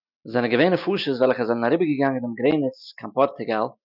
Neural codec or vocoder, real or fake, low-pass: none; real; 5.4 kHz